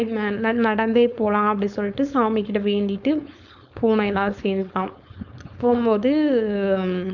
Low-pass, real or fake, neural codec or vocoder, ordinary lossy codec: 7.2 kHz; fake; codec, 16 kHz, 4.8 kbps, FACodec; none